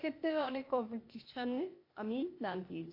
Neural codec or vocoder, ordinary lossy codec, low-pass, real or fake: codec, 16 kHz, 0.8 kbps, ZipCodec; MP3, 32 kbps; 5.4 kHz; fake